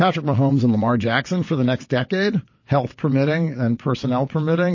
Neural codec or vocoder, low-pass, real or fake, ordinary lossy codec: vocoder, 22.05 kHz, 80 mel bands, WaveNeXt; 7.2 kHz; fake; MP3, 32 kbps